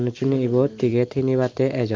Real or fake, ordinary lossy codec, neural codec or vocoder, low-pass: real; Opus, 24 kbps; none; 7.2 kHz